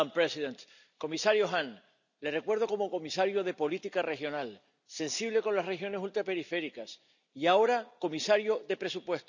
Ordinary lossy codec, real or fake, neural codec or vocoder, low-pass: none; real; none; 7.2 kHz